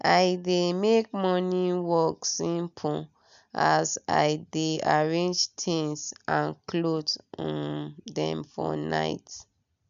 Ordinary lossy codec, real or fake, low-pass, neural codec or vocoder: none; real; 7.2 kHz; none